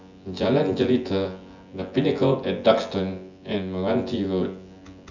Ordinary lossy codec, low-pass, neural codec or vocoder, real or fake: none; 7.2 kHz; vocoder, 24 kHz, 100 mel bands, Vocos; fake